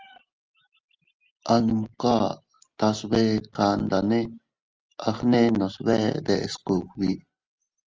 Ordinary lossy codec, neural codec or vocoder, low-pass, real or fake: Opus, 24 kbps; none; 7.2 kHz; real